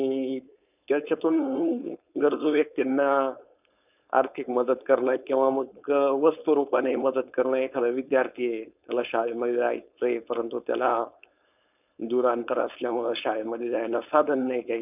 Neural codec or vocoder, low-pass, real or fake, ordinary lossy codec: codec, 16 kHz, 4.8 kbps, FACodec; 3.6 kHz; fake; none